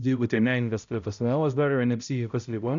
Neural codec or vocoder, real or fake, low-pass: codec, 16 kHz, 0.5 kbps, X-Codec, HuBERT features, trained on balanced general audio; fake; 7.2 kHz